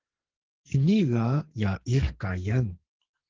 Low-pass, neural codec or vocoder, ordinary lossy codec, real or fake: 7.2 kHz; codec, 44.1 kHz, 2.6 kbps, SNAC; Opus, 16 kbps; fake